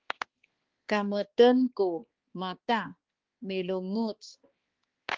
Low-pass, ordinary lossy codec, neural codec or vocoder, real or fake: 7.2 kHz; Opus, 16 kbps; codec, 16 kHz, 2 kbps, X-Codec, HuBERT features, trained on balanced general audio; fake